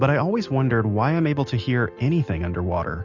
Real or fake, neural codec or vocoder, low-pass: real; none; 7.2 kHz